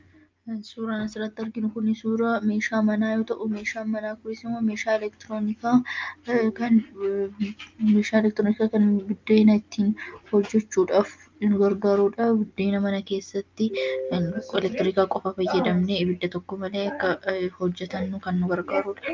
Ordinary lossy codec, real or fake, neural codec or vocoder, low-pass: Opus, 24 kbps; real; none; 7.2 kHz